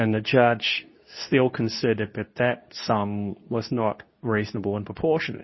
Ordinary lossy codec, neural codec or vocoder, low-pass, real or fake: MP3, 24 kbps; codec, 24 kHz, 0.9 kbps, WavTokenizer, medium speech release version 2; 7.2 kHz; fake